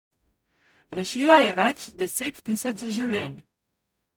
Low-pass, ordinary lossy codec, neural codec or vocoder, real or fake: none; none; codec, 44.1 kHz, 0.9 kbps, DAC; fake